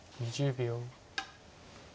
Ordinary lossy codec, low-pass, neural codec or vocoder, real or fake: none; none; none; real